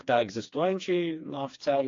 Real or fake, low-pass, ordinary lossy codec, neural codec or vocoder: fake; 7.2 kHz; AAC, 48 kbps; codec, 16 kHz, 2 kbps, FreqCodec, smaller model